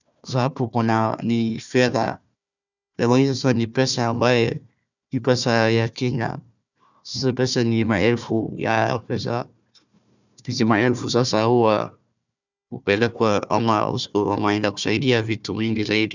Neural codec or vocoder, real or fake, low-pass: codec, 16 kHz, 1 kbps, FunCodec, trained on Chinese and English, 50 frames a second; fake; 7.2 kHz